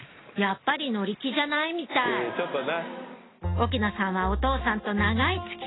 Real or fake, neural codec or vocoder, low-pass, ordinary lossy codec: real; none; 7.2 kHz; AAC, 16 kbps